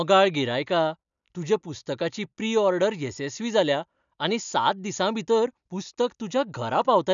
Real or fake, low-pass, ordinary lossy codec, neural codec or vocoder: real; 7.2 kHz; none; none